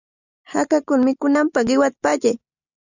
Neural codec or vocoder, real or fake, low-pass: none; real; 7.2 kHz